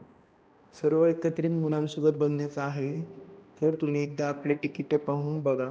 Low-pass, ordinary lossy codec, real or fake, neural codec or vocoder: none; none; fake; codec, 16 kHz, 1 kbps, X-Codec, HuBERT features, trained on balanced general audio